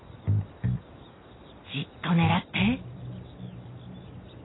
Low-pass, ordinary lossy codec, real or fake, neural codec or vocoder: 7.2 kHz; AAC, 16 kbps; real; none